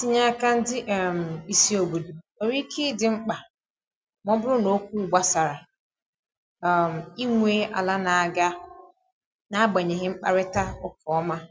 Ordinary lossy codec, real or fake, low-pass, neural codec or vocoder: none; real; none; none